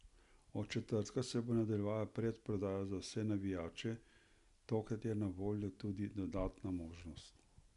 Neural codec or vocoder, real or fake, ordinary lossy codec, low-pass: none; real; none; 10.8 kHz